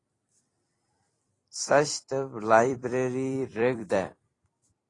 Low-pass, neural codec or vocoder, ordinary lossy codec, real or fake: 10.8 kHz; vocoder, 44.1 kHz, 128 mel bands every 256 samples, BigVGAN v2; AAC, 32 kbps; fake